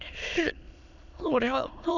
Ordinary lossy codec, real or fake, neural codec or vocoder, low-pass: none; fake; autoencoder, 22.05 kHz, a latent of 192 numbers a frame, VITS, trained on many speakers; 7.2 kHz